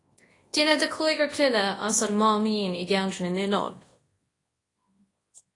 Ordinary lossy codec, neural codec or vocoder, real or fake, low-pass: AAC, 32 kbps; codec, 24 kHz, 0.9 kbps, WavTokenizer, large speech release; fake; 10.8 kHz